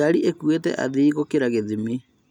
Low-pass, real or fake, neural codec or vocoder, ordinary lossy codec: 19.8 kHz; real; none; none